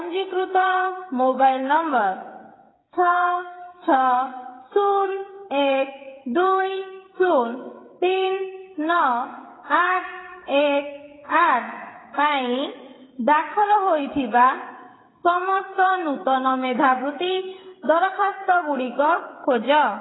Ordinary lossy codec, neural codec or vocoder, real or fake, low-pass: AAC, 16 kbps; codec, 16 kHz, 8 kbps, FreqCodec, smaller model; fake; 7.2 kHz